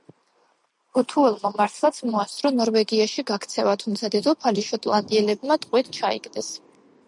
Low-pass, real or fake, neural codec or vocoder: 10.8 kHz; real; none